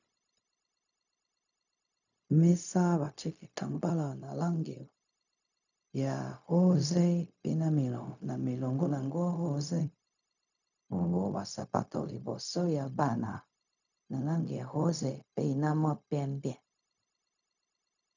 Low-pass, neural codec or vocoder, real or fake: 7.2 kHz; codec, 16 kHz, 0.4 kbps, LongCat-Audio-Codec; fake